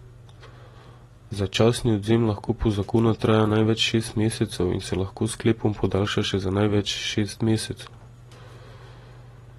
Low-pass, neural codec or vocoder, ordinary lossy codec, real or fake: 19.8 kHz; none; AAC, 32 kbps; real